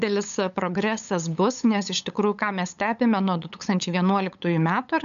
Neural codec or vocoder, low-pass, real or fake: codec, 16 kHz, 8 kbps, FunCodec, trained on LibriTTS, 25 frames a second; 7.2 kHz; fake